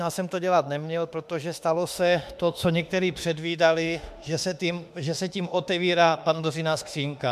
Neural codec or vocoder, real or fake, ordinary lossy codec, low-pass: autoencoder, 48 kHz, 32 numbers a frame, DAC-VAE, trained on Japanese speech; fake; MP3, 96 kbps; 14.4 kHz